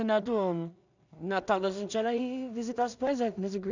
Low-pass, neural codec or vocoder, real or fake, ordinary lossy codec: 7.2 kHz; codec, 16 kHz in and 24 kHz out, 0.4 kbps, LongCat-Audio-Codec, two codebook decoder; fake; none